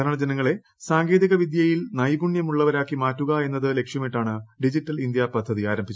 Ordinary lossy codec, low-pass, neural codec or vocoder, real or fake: none; none; none; real